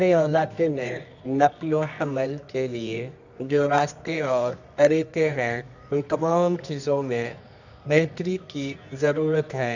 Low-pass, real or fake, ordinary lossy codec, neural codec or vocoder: 7.2 kHz; fake; none; codec, 24 kHz, 0.9 kbps, WavTokenizer, medium music audio release